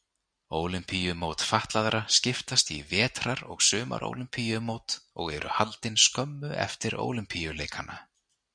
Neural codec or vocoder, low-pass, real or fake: none; 9.9 kHz; real